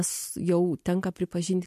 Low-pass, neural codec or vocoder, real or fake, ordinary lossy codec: 14.4 kHz; none; real; MP3, 64 kbps